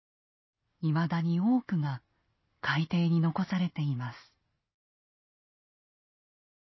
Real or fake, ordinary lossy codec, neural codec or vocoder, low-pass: real; MP3, 24 kbps; none; 7.2 kHz